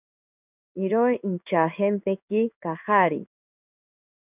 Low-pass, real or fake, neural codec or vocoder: 3.6 kHz; fake; codec, 16 kHz in and 24 kHz out, 1 kbps, XY-Tokenizer